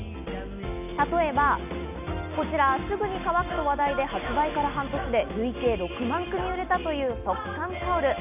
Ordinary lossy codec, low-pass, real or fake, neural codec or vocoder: none; 3.6 kHz; real; none